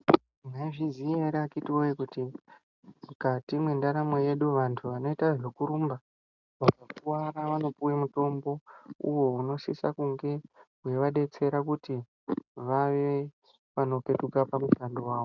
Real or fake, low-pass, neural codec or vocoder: real; 7.2 kHz; none